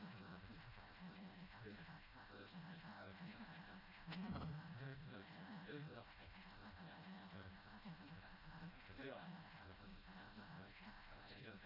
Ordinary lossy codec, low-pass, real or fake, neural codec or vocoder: none; 5.4 kHz; fake; codec, 16 kHz, 0.5 kbps, FreqCodec, smaller model